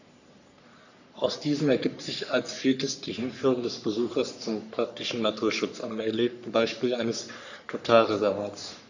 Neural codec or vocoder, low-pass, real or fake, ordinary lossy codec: codec, 44.1 kHz, 3.4 kbps, Pupu-Codec; 7.2 kHz; fake; none